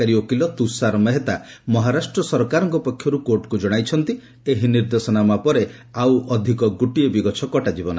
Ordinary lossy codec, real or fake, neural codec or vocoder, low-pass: none; real; none; none